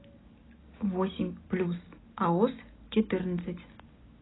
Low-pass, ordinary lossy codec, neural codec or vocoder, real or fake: 7.2 kHz; AAC, 16 kbps; none; real